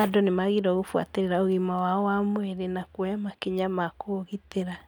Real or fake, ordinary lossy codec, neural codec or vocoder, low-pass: real; none; none; none